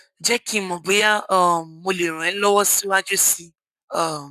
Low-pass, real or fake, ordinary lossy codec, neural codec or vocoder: 14.4 kHz; fake; none; codec, 44.1 kHz, 7.8 kbps, Pupu-Codec